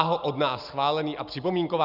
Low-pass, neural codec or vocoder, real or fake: 5.4 kHz; none; real